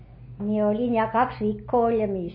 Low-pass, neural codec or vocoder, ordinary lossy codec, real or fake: 5.4 kHz; none; MP3, 24 kbps; real